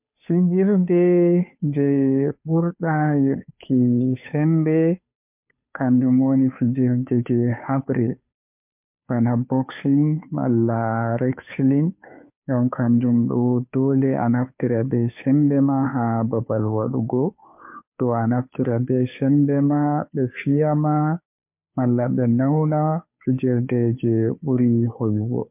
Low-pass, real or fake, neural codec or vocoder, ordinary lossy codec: 3.6 kHz; fake; codec, 16 kHz, 2 kbps, FunCodec, trained on Chinese and English, 25 frames a second; MP3, 32 kbps